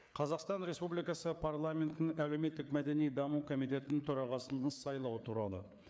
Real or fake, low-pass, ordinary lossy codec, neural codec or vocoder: fake; none; none; codec, 16 kHz, 4 kbps, FreqCodec, larger model